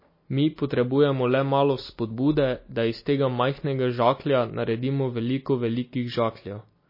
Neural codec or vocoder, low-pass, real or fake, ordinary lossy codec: none; 5.4 kHz; real; MP3, 24 kbps